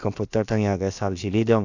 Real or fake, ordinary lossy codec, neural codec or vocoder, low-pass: fake; none; codec, 16 kHz, about 1 kbps, DyCAST, with the encoder's durations; 7.2 kHz